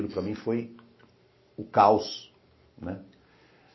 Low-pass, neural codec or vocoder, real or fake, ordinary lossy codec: 7.2 kHz; none; real; MP3, 24 kbps